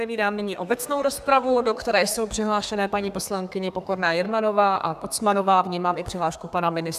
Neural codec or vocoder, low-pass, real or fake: codec, 32 kHz, 1.9 kbps, SNAC; 14.4 kHz; fake